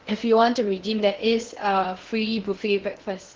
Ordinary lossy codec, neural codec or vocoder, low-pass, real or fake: Opus, 16 kbps; codec, 16 kHz in and 24 kHz out, 0.8 kbps, FocalCodec, streaming, 65536 codes; 7.2 kHz; fake